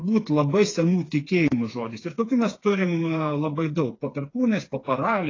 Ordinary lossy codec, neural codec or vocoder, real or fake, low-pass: AAC, 32 kbps; codec, 16 kHz, 4 kbps, FreqCodec, smaller model; fake; 7.2 kHz